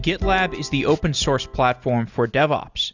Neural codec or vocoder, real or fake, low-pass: none; real; 7.2 kHz